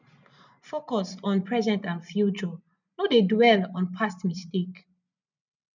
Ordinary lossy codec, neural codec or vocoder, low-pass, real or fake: none; none; 7.2 kHz; real